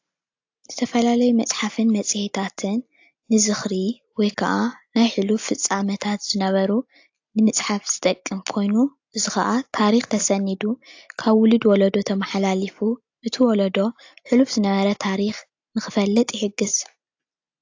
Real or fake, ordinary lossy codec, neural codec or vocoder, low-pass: real; AAC, 48 kbps; none; 7.2 kHz